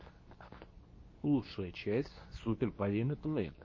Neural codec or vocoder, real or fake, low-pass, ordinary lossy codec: codec, 24 kHz, 0.9 kbps, WavTokenizer, medium speech release version 2; fake; 7.2 kHz; MP3, 32 kbps